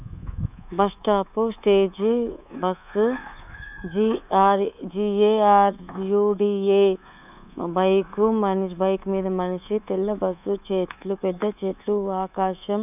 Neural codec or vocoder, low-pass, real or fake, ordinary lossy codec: codec, 24 kHz, 3.1 kbps, DualCodec; 3.6 kHz; fake; none